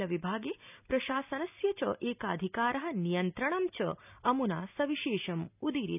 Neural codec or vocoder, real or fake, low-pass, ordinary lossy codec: none; real; 3.6 kHz; none